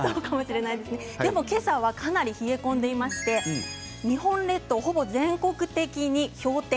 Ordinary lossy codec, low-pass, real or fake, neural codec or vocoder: none; none; real; none